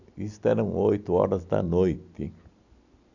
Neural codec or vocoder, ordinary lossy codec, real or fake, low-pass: none; none; real; 7.2 kHz